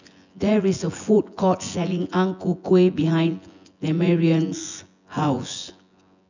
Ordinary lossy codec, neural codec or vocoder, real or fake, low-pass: none; vocoder, 24 kHz, 100 mel bands, Vocos; fake; 7.2 kHz